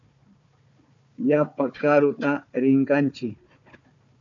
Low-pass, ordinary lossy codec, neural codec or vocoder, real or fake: 7.2 kHz; AAC, 64 kbps; codec, 16 kHz, 4 kbps, FunCodec, trained on Chinese and English, 50 frames a second; fake